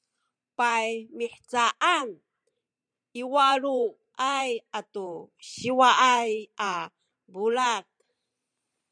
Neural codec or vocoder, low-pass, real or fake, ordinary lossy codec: vocoder, 44.1 kHz, 128 mel bands every 512 samples, BigVGAN v2; 9.9 kHz; fake; MP3, 96 kbps